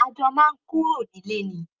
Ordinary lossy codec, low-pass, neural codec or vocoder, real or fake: Opus, 32 kbps; 7.2 kHz; none; real